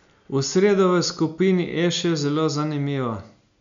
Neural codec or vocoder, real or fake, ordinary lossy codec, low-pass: none; real; MP3, 64 kbps; 7.2 kHz